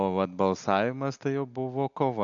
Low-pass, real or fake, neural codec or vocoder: 7.2 kHz; real; none